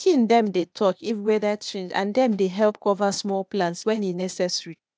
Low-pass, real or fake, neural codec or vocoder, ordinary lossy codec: none; fake; codec, 16 kHz, 0.8 kbps, ZipCodec; none